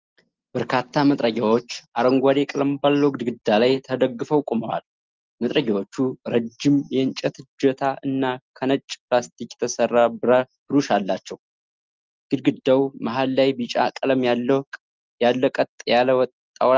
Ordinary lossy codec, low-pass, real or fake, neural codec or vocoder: Opus, 32 kbps; 7.2 kHz; real; none